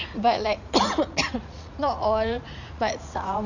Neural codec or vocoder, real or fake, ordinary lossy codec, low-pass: vocoder, 44.1 kHz, 80 mel bands, Vocos; fake; none; 7.2 kHz